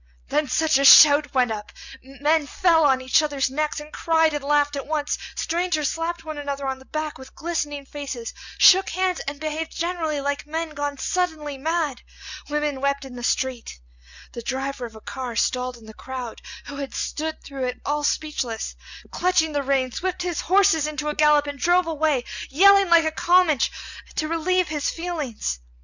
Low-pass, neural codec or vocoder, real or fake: 7.2 kHz; none; real